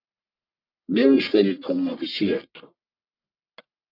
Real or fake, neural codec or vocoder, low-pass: fake; codec, 44.1 kHz, 1.7 kbps, Pupu-Codec; 5.4 kHz